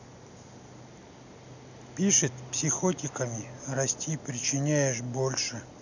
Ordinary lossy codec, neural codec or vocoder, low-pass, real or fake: none; none; 7.2 kHz; real